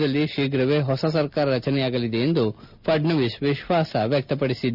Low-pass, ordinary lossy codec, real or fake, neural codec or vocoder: 5.4 kHz; none; real; none